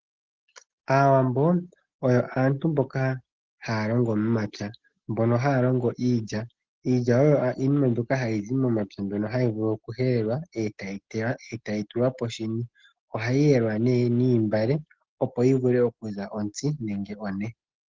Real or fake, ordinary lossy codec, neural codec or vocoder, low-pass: real; Opus, 16 kbps; none; 7.2 kHz